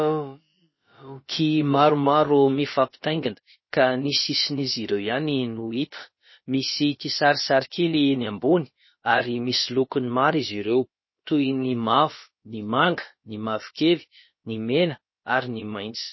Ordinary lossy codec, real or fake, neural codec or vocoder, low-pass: MP3, 24 kbps; fake; codec, 16 kHz, about 1 kbps, DyCAST, with the encoder's durations; 7.2 kHz